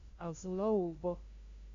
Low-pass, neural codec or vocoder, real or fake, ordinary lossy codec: 7.2 kHz; codec, 16 kHz, 0.5 kbps, FunCodec, trained on LibriTTS, 25 frames a second; fake; MP3, 48 kbps